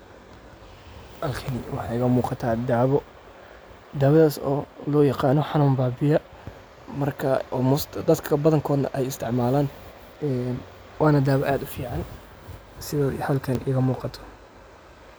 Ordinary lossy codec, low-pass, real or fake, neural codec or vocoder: none; none; real; none